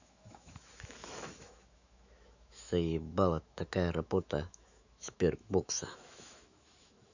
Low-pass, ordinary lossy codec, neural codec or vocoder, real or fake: 7.2 kHz; none; autoencoder, 48 kHz, 128 numbers a frame, DAC-VAE, trained on Japanese speech; fake